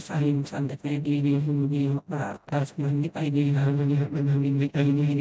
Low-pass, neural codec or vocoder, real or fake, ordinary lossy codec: none; codec, 16 kHz, 0.5 kbps, FreqCodec, smaller model; fake; none